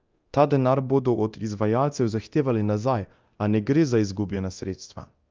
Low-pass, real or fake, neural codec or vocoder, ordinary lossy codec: 7.2 kHz; fake; codec, 24 kHz, 0.9 kbps, DualCodec; Opus, 24 kbps